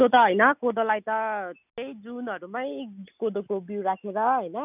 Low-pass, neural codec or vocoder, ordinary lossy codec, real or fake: 3.6 kHz; none; none; real